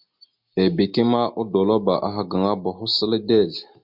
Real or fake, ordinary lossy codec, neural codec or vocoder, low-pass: real; MP3, 48 kbps; none; 5.4 kHz